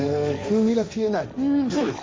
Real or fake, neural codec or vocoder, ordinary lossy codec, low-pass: fake; codec, 16 kHz, 1.1 kbps, Voila-Tokenizer; none; none